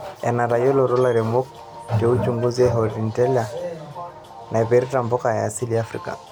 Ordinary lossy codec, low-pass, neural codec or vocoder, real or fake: none; none; none; real